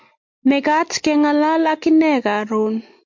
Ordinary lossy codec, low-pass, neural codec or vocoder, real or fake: MP3, 48 kbps; 7.2 kHz; none; real